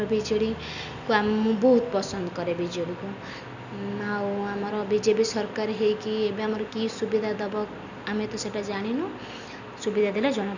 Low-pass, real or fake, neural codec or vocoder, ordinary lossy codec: 7.2 kHz; real; none; none